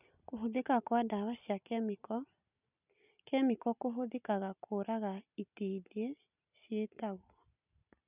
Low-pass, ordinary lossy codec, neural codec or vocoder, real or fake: 3.6 kHz; none; none; real